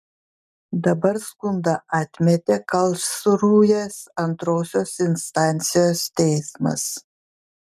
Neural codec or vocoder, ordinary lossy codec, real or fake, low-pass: none; MP3, 96 kbps; real; 14.4 kHz